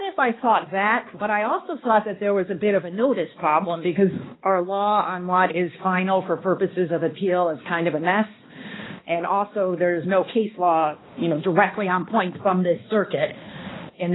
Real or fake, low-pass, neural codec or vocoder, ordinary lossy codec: fake; 7.2 kHz; codec, 16 kHz, 2 kbps, X-Codec, HuBERT features, trained on balanced general audio; AAC, 16 kbps